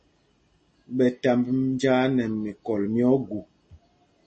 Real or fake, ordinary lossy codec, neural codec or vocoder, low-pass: real; MP3, 32 kbps; none; 9.9 kHz